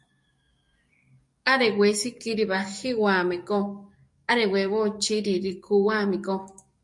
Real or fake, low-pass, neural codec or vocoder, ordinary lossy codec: fake; 10.8 kHz; codec, 44.1 kHz, 7.8 kbps, DAC; MP3, 48 kbps